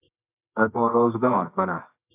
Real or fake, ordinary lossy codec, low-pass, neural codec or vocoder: fake; AAC, 32 kbps; 3.6 kHz; codec, 24 kHz, 0.9 kbps, WavTokenizer, medium music audio release